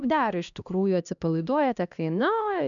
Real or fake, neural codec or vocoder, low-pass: fake; codec, 16 kHz, 1 kbps, X-Codec, HuBERT features, trained on LibriSpeech; 7.2 kHz